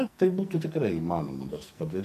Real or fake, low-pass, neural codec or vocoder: fake; 14.4 kHz; codec, 44.1 kHz, 2.6 kbps, SNAC